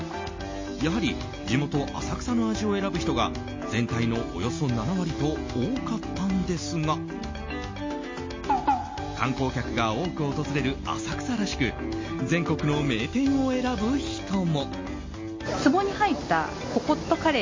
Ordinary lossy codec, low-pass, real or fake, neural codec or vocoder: MP3, 32 kbps; 7.2 kHz; real; none